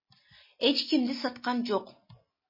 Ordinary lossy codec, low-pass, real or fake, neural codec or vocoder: MP3, 24 kbps; 5.4 kHz; real; none